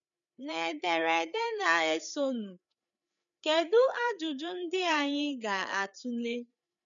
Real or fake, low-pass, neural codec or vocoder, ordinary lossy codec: fake; 7.2 kHz; codec, 16 kHz, 4 kbps, FreqCodec, larger model; none